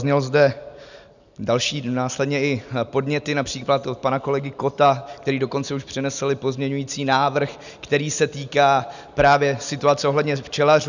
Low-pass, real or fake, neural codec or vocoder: 7.2 kHz; real; none